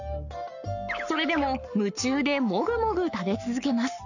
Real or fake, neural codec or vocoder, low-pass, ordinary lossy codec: fake; codec, 44.1 kHz, 7.8 kbps, Pupu-Codec; 7.2 kHz; none